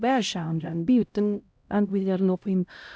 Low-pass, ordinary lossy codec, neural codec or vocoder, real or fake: none; none; codec, 16 kHz, 0.5 kbps, X-Codec, HuBERT features, trained on LibriSpeech; fake